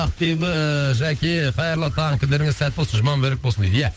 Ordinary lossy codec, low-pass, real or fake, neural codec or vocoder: none; none; fake; codec, 16 kHz, 8 kbps, FunCodec, trained on Chinese and English, 25 frames a second